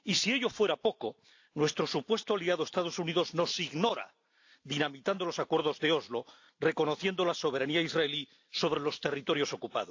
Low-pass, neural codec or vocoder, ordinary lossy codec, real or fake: 7.2 kHz; none; AAC, 48 kbps; real